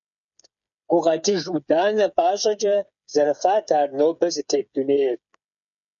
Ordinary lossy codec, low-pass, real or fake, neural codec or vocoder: MP3, 96 kbps; 7.2 kHz; fake; codec, 16 kHz, 8 kbps, FreqCodec, smaller model